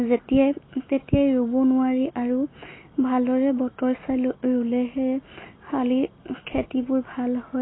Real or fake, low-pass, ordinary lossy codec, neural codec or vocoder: real; 7.2 kHz; AAC, 16 kbps; none